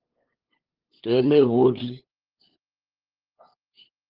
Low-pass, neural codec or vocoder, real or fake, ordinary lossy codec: 5.4 kHz; codec, 16 kHz, 8 kbps, FunCodec, trained on LibriTTS, 25 frames a second; fake; Opus, 16 kbps